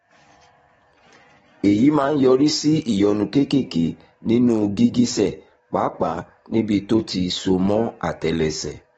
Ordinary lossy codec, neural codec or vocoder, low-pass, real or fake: AAC, 24 kbps; codec, 44.1 kHz, 7.8 kbps, DAC; 19.8 kHz; fake